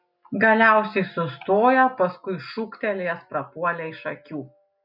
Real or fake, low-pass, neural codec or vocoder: real; 5.4 kHz; none